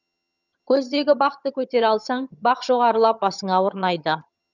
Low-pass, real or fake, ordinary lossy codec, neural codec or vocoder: 7.2 kHz; fake; none; vocoder, 22.05 kHz, 80 mel bands, HiFi-GAN